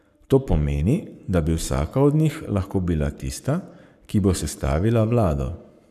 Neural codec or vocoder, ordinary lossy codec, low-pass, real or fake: codec, 44.1 kHz, 7.8 kbps, Pupu-Codec; none; 14.4 kHz; fake